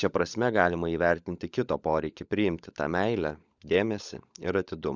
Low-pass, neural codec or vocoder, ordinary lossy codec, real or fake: 7.2 kHz; codec, 16 kHz, 16 kbps, FunCodec, trained on LibriTTS, 50 frames a second; Opus, 64 kbps; fake